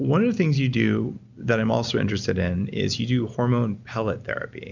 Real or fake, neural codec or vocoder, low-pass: real; none; 7.2 kHz